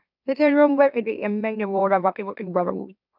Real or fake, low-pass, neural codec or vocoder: fake; 5.4 kHz; autoencoder, 44.1 kHz, a latent of 192 numbers a frame, MeloTTS